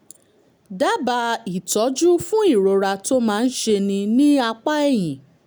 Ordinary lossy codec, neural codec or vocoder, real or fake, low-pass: none; none; real; none